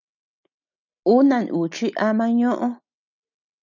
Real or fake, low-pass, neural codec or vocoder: real; 7.2 kHz; none